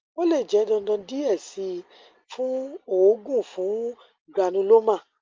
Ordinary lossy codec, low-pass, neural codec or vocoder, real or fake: Opus, 32 kbps; 7.2 kHz; none; real